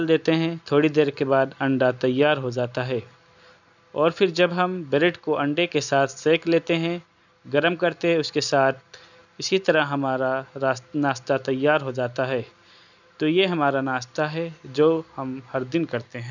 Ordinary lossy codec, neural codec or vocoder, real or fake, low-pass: none; none; real; 7.2 kHz